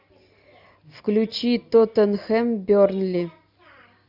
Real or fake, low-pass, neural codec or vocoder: real; 5.4 kHz; none